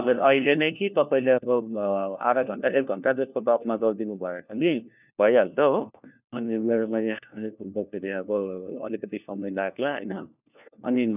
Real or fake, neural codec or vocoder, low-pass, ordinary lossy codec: fake; codec, 16 kHz, 1 kbps, FunCodec, trained on LibriTTS, 50 frames a second; 3.6 kHz; none